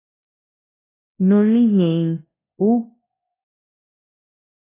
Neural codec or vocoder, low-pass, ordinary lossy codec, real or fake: codec, 24 kHz, 0.9 kbps, WavTokenizer, large speech release; 3.6 kHz; AAC, 16 kbps; fake